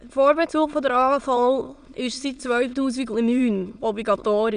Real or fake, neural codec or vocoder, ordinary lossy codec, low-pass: fake; autoencoder, 22.05 kHz, a latent of 192 numbers a frame, VITS, trained on many speakers; none; 9.9 kHz